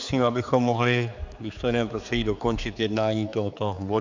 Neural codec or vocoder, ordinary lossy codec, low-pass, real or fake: codec, 16 kHz, 4 kbps, X-Codec, HuBERT features, trained on general audio; MP3, 64 kbps; 7.2 kHz; fake